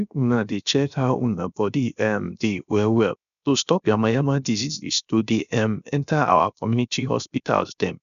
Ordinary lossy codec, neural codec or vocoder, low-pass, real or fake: none; codec, 16 kHz, 0.7 kbps, FocalCodec; 7.2 kHz; fake